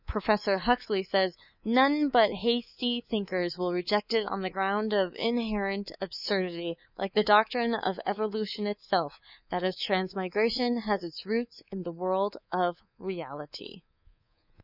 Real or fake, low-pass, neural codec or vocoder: fake; 5.4 kHz; codec, 24 kHz, 3.1 kbps, DualCodec